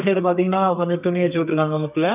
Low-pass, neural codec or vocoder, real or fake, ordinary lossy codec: 3.6 kHz; codec, 32 kHz, 1.9 kbps, SNAC; fake; none